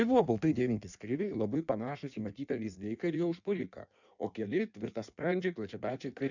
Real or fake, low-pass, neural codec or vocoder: fake; 7.2 kHz; codec, 16 kHz in and 24 kHz out, 1.1 kbps, FireRedTTS-2 codec